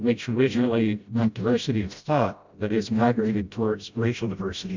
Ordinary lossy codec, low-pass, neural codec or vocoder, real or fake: MP3, 64 kbps; 7.2 kHz; codec, 16 kHz, 0.5 kbps, FreqCodec, smaller model; fake